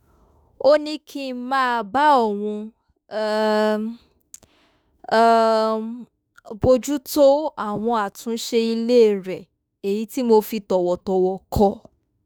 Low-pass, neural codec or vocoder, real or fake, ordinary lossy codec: none; autoencoder, 48 kHz, 32 numbers a frame, DAC-VAE, trained on Japanese speech; fake; none